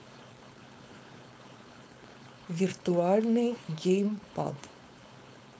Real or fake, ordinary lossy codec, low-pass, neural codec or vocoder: fake; none; none; codec, 16 kHz, 4.8 kbps, FACodec